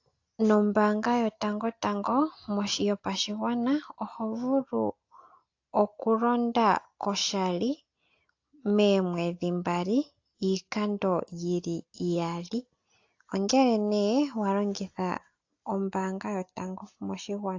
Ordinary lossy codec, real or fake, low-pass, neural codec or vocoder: AAC, 48 kbps; real; 7.2 kHz; none